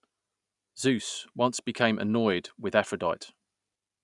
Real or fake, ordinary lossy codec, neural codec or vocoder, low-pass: real; none; none; 10.8 kHz